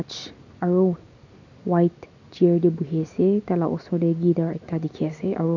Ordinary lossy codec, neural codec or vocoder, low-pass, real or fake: none; none; 7.2 kHz; real